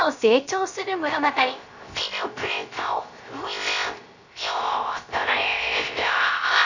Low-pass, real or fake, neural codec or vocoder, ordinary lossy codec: 7.2 kHz; fake; codec, 16 kHz, 0.3 kbps, FocalCodec; none